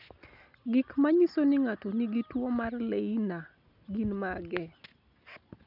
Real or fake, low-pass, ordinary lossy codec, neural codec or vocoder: real; 5.4 kHz; none; none